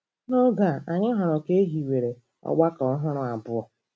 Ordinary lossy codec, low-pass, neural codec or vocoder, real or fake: none; none; none; real